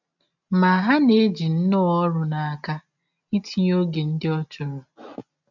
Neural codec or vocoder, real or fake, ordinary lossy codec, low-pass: none; real; none; 7.2 kHz